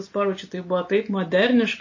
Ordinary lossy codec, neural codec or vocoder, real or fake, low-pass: MP3, 32 kbps; none; real; 7.2 kHz